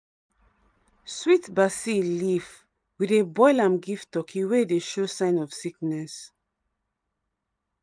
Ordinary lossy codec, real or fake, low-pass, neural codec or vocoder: none; fake; 9.9 kHz; vocoder, 44.1 kHz, 128 mel bands every 256 samples, BigVGAN v2